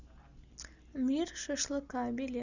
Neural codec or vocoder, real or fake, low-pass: none; real; 7.2 kHz